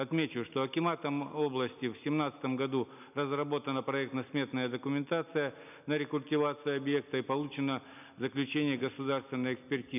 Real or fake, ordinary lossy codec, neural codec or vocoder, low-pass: real; none; none; 3.6 kHz